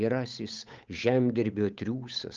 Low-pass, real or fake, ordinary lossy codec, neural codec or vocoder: 7.2 kHz; fake; Opus, 24 kbps; codec, 16 kHz, 8 kbps, FunCodec, trained on Chinese and English, 25 frames a second